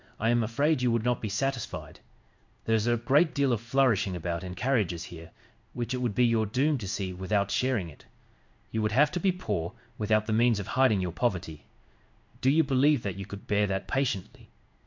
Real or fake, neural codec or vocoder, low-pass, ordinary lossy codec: fake; codec, 16 kHz in and 24 kHz out, 1 kbps, XY-Tokenizer; 7.2 kHz; MP3, 64 kbps